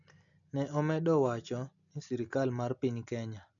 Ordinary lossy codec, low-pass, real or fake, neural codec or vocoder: none; 7.2 kHz; real; none